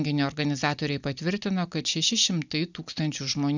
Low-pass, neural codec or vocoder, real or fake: 7.2 kHz; none; real